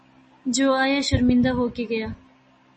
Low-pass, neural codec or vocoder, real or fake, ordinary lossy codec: 10.8 kHz; none; real; MP3, 32 kbps